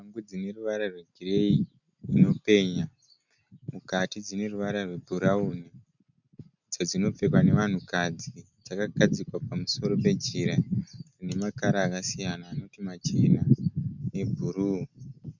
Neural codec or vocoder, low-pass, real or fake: none; 7.2 kHz; real